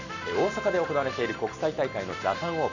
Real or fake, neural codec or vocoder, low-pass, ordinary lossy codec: real; none; 7.2 kHz; none